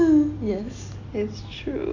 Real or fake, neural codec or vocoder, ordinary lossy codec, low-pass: real; none; none; 7.2 kHz